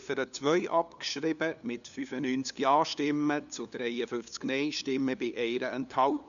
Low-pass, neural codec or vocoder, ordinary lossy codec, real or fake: 7.2 kHz; codec, 16 kHz, 2 kbps, FunCodec, trained on LibriTTS, 25 frames a second; none; fake